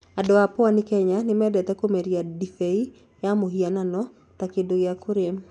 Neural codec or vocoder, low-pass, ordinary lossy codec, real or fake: none; 10.8 kHz; none; real